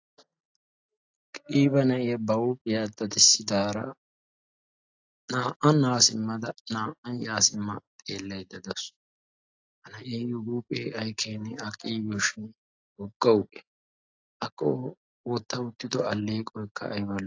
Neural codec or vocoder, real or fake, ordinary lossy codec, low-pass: none; real; AAC, 48 kbps; 7.2 kHz